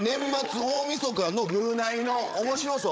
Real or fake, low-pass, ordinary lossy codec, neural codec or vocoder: fake; none; none; codec, 16 kHz, 8 kbps, FreqCodec, larger model